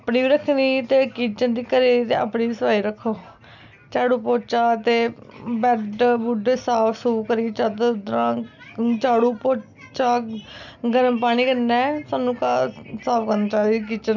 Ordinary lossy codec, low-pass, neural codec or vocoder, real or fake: none; 7.2 kHz; none; real